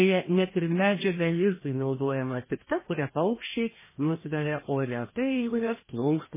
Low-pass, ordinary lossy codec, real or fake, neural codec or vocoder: 3.6 kHz; MP3, 16 kbps; fake; codec, 16 kHz, 1 kbps, FreqCodec, larger model